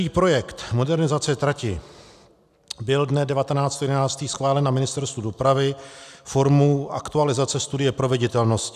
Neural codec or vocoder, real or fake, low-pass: none; real; 14.4 kHz